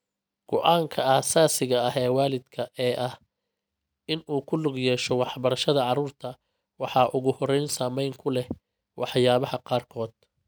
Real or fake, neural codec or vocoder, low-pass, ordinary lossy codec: real; none; none; none